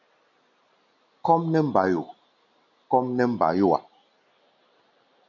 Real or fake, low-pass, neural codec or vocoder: real; 7.2 kHz; none